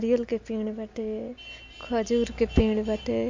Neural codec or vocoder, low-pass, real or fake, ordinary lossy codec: none; 7.2 kHz; real; MP3, 48 kbps